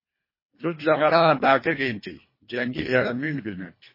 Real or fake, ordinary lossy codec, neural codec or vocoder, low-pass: fake; MP3, 24 kbps; codec, 24 kHz, 1.5 kbps, HILCodec; 5.4 kHz